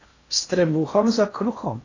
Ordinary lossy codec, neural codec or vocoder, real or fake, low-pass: AAC, 32 kbps; codec, 16 kHz in and 24 kHz out, 0.6 kbps, FocalCodec, streaming, 4096 codes; fake; 7.2 kHz